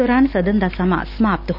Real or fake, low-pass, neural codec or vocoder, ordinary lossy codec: real; 5.4 kHz; none; none